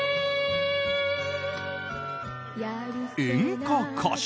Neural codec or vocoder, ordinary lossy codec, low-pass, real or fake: none; none; none; real